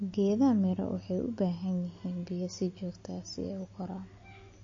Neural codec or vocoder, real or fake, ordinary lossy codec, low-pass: none; real; MP3, 32 kbps; 7.2 kHz